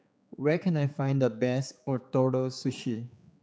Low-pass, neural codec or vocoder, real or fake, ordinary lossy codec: none; codec, 16 kHz, 4 kbps, X-Codec, HuBERT features, trained on general audio; fake; none